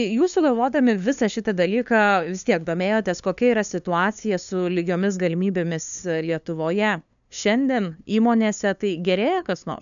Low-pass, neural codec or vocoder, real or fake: 7.2 kHz; codec, 16 kHz, 2 kbps, FunCodec, trained on LibriTTS, 25 frames a second; fake